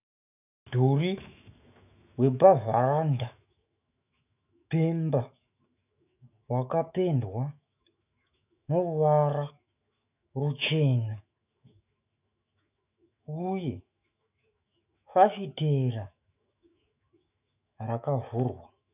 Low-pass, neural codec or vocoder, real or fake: 3.6 kHz; autoencoder, 48 kHz, 128 numbers a frame, DAC-VAE, trained on Japanese speech; fake